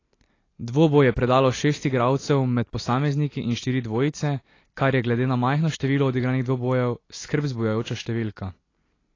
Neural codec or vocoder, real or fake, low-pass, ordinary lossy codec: none; real; 7.2 kHz; AAC, 32 kbps